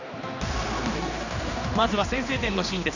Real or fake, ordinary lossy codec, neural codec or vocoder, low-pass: fake; none; codec, 16 kHz, 2 kbps, X-Codec, HuBERT features, trained on general audio; 7.2 kHz